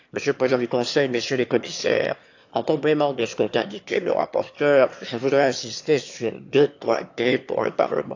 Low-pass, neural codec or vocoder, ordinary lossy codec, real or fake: 7.2 kHz; autoencoder, 22.05 kHz, a latent of 192 numbers a frame, VITS, trained on one speaker; AAC, 48 kbps; fake